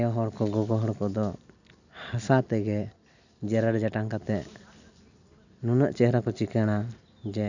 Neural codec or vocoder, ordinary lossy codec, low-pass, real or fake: none; none; 7.2 kHz; real